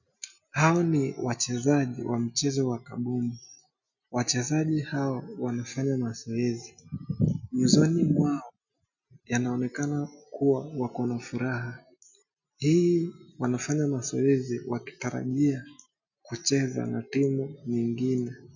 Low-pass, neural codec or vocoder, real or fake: 7.2 kHz; none; real